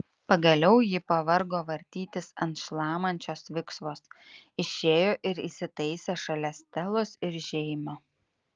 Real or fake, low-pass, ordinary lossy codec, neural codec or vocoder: real; 7.2 kHz; Opus, 32 kbps; none